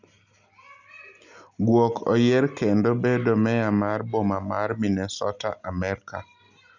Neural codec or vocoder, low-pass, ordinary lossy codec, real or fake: none; 7.2 kHz; none; real